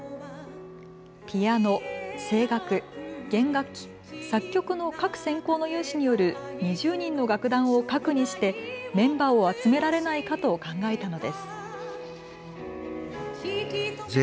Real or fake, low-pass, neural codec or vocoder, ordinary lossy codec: real; none; none; none